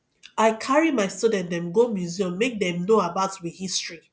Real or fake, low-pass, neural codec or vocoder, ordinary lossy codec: real; none; none; none